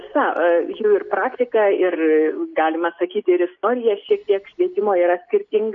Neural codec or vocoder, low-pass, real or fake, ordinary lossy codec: none; 7.2 kHz; real; AAC, 64 kbps